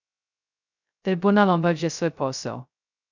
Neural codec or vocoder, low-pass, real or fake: codec, 16 kHz, 0.2 kbps, FocalCodec; 7.2 kHz; fake